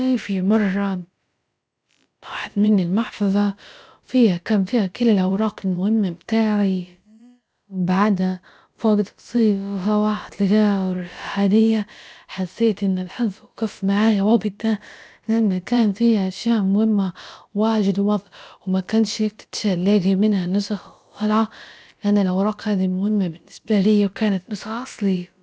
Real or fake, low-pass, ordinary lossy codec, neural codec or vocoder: fake; none; none; codec, 16 kHz, about 1 kbps, DyCAST, with the encoder's durations